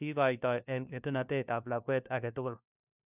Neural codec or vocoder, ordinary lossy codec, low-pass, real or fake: codec, 16 kHz, 1 kbps, FunCodec, trained on LibriTTS, 50 frames a second; none; 3.6 kHz; fake